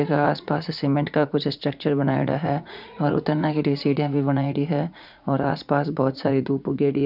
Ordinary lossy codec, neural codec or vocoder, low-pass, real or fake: none; vocoder, 44.1 kHz, 80 mel bands, Vocos; 5.4 kHz; fake